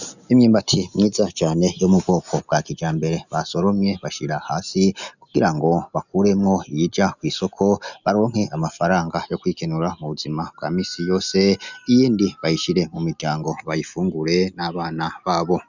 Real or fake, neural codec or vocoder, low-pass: real; none; 7.2 kHz